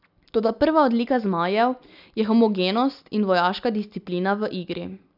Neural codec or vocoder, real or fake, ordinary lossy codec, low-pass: none; real; none; 5.4 kHz